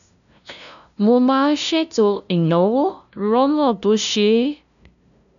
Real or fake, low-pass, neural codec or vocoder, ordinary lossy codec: fake; 7.2 kHz; codec, 16 kHz, 0.5 kbps, FunCodec, trained on LibriTTS, 25 frames a second; none